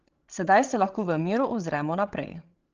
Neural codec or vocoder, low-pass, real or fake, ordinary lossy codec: codec, 16 kHz, 16 kbps, FreqCodec, larger model; 7.2 kHz; fake; Opus, 16 kbps